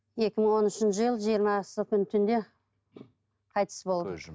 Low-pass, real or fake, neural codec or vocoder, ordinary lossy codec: none; real; none; none